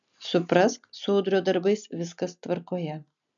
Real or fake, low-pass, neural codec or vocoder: real; 7.2 kHz; none